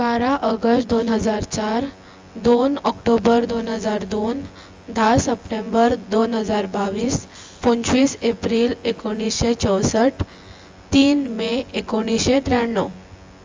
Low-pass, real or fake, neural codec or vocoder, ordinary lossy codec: 7.2 kHz; fake; vocoder, 24 kHz, 100 mel bands, Vocos; Opus, 32 kbps